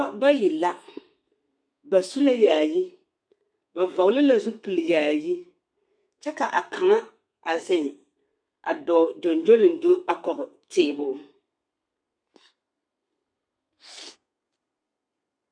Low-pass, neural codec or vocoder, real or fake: 9.9 kHz; codec, 32 kHz, 1.9 kbps, SNAC; fake